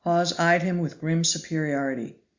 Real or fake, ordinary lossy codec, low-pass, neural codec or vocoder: real; Opus, 64 kbps; 7.2 kHz; none